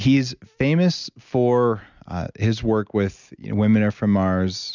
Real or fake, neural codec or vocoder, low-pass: real; none; 7.2 kHz